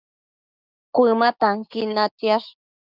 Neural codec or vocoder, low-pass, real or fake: codec, 16 kHz, 6 kbps, DAC; 5.4 kHz; fake